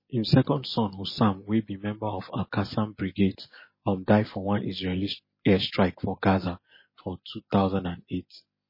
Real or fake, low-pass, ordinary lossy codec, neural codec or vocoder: real; 5.4 kHz; MP3, 24 kbps; none